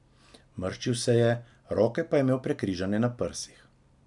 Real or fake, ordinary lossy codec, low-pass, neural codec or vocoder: real; none; 10.8 kHz; none